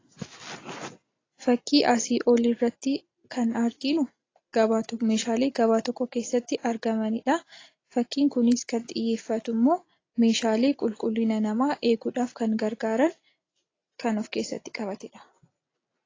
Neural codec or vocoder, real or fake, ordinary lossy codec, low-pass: none; real; AAC, 32 kbps; 7.2 kHz